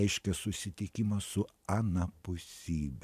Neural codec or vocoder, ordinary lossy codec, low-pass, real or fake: none; MP3, 96 kbps; 14.4 kHz; real